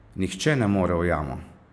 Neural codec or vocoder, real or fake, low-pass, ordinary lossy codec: none; real; none; none